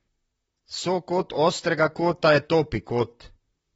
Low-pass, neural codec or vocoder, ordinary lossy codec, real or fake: 19.8 kHz; vocoder, 44.1 kHz, 128 mel bands, Pupu-Vocoder; AAC, 24 kbps; fake